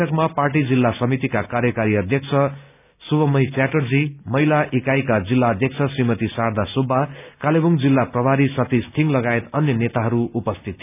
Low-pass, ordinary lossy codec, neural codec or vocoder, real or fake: 3.6 kHz; none; none; real